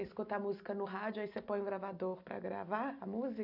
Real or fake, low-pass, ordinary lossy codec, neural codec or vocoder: real; 5.4 kHz; none; none